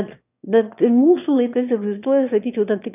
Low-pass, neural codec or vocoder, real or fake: 3.6 kHz; autoencoder, 22.05 kHz, a latent of 192 numbers a frame, VITS, trained on one speaker; fake